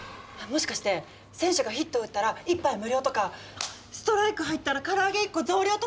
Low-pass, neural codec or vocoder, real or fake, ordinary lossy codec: none; none; real; none